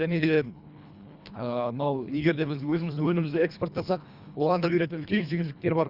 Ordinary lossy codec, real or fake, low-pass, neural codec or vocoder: Opus, 64 kbps; fake; 5.4 kHz; codec, 24 kHz, 1.5 kbps, HILCodec